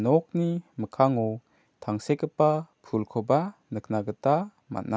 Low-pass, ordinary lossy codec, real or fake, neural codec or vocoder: none; none; real; none